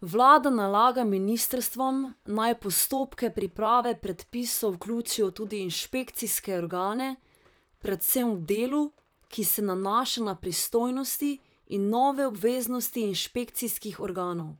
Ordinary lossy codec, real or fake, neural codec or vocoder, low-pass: none; fake; vocoder, 44.1 kHz, 128 mel bands, Pupu-Vocoder; none